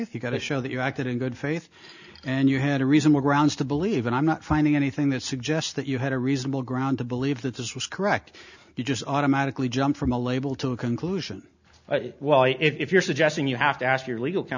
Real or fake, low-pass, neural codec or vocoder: real; 7.2 kHz; none